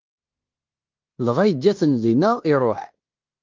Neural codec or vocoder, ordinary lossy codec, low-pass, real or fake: codec, 16 kHz in and 24 kHz out, 0.9 kbps, LongCat-Audio-Codec, fine tuned four codebook decoder; Opus, 32 kbps; 7.2 kHz; fake